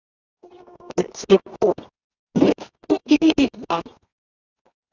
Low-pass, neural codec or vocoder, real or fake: 7.2 kHz; codec, 24 kHz, 0.9 kbps, WavTokenizer, medium music audio release; fake